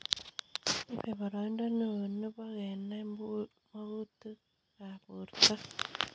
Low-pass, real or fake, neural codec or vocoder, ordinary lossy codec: none; real; none; none